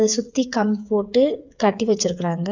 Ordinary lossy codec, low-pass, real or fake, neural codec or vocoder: none; 7.2 kHz; fake; codec, 16 kHz, 8 kbps, FreqCodec, smaller model